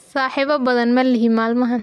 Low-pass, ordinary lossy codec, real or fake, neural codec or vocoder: none; none; real; none